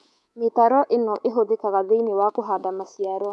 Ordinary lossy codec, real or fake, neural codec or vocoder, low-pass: none; fake; codec, 24 kHz, 3.1 kbps, DualCodec; none